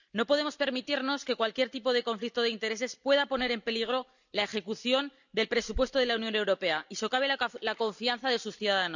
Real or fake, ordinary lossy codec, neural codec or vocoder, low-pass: real; none; none; 7.2 kHz